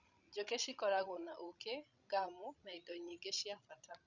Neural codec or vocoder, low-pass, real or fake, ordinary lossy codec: codec, 16 kHz, 16 kbps, FreqCodec, larger model; 7.2 kHz; fake; none